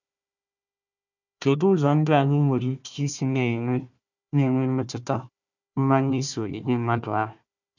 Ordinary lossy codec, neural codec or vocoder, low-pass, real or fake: none; codec, 16 kHz, 1 kbps, FunCodec, trained on Chinese and English, 50 frames a second; 7.2 kHz; fake